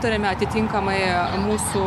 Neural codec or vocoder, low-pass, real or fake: none; 14.4 kHz; real